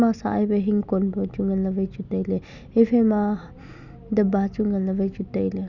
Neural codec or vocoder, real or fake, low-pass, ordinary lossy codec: none; real; 7.2 kHz; none